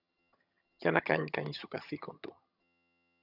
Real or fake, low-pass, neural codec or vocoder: fake; 5.4 kHz; vocoder, 22.05 kHz, 80 mel bands, HiFi-GAN